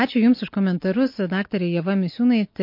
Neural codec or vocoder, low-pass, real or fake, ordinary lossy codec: none; 5.4 kHz; real; MP3, 32 kbps